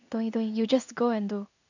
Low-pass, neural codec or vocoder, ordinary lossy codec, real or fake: 7.2 kHz; codec, 16 kHz in and 24 kHz out, 1 kbps, XY-Tokenizer; none; fake